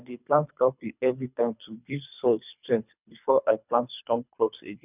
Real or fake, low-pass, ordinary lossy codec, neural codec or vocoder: fake; 3.6 kHz; none; codec, 24 kHz, 3 kbps, HILCodec